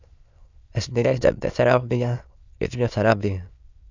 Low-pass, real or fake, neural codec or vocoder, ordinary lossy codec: 7.2 kHz; fake; autoencoder, 22.05 kHz, a latent of 192 numbers a frame, VITS, trained on many speakers; Opus, 64 kbps